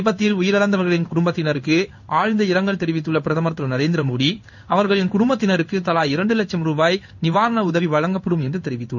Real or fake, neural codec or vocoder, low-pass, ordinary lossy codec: fake; codec, 16 kHz in and 24 kHz out, 1 kbps, XY-Tokenizer; 7.2 kHz; none